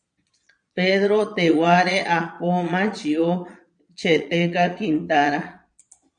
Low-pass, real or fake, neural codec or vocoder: 9.9 kHz; fake; vocoder, 22.05 kHz, 80 mel bands, Vocos